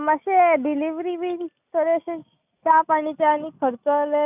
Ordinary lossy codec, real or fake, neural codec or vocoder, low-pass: none; real; none; 3.6 kHz